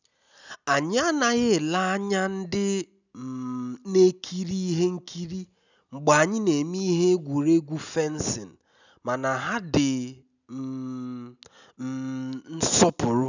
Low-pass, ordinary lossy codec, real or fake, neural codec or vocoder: 7.2 kHz; none; real; none